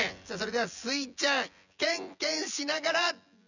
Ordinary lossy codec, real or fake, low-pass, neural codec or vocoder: none; fake; 7.2 kHz; vocoder, 24 kHz, 100 mel bands, Vocos